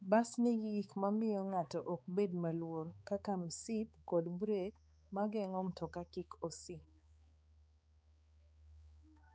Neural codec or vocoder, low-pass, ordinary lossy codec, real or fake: codec, 16 kHz, 4 kbps, X-Codec, HuBERT features, trained on balanced general audio; none; none; fake